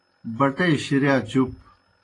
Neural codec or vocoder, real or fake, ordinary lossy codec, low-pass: none; real; AAC, 32 kbps; 10.8 kHz